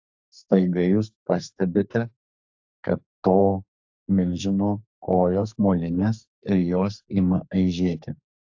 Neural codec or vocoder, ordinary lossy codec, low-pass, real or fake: codec, 44.1 kHz, 2.6 kbps, SNAC; AAC, 48 kbps; 7.2 kHz; fake